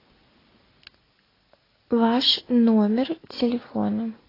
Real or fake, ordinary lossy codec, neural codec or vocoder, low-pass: real; AAC, 24 kbps; none; 5.4 kHz